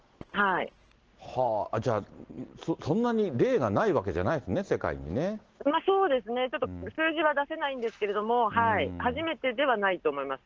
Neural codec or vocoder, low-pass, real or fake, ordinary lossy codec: none; 7.2 kHz; real; Opus, 16 kbps